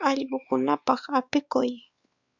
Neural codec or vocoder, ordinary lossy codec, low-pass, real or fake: codec, 16 kHz, 4 kbps, X-Codec, WavLM features, trained on Multilingual LibriSpeech; Opus, 64 kbps; 7.2 kHz; fake